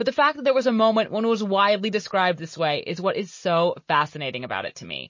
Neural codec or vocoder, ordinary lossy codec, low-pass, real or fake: none; MP3, 32 kbps; 7.2 kHz; real